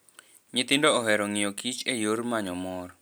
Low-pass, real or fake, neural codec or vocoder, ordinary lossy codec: none; real; none; none